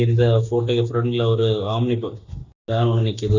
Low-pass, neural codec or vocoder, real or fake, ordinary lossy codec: 7.2 kHz; none; real; none